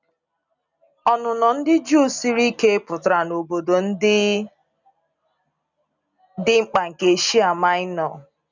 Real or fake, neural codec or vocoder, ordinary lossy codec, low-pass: real; none; none; 7.2 kHz